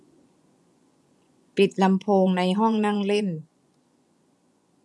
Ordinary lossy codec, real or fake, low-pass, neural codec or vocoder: none; fake; none; vocoder, 24 kHz, 100 mel bands, Vocos